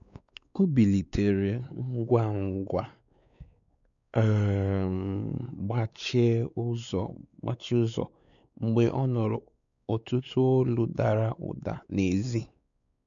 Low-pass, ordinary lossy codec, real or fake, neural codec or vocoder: 7.2 kHz; none; fake; codec, 16 kHz, 4 kbps, X-Codec, WavLM features, trained on Multilingual LibriSpeech